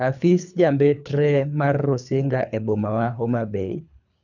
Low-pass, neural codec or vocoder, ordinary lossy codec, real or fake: 7.2 kHz; codec, 24 kHz, 3 kbps, HILCodec; none; fake